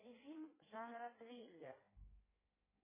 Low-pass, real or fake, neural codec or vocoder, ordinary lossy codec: 3.6 kHz; fake; codec, 16 kHz in and 24 kHz out, 1.1 kbps, FireRedTTS-2 codec; MP3, 16 kbps